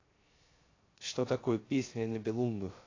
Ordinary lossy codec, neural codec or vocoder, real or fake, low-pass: AAC, 32 kbps; codec, 16 kHz, 0.3 kbps, FocalCodec; fake; 7.2 kHz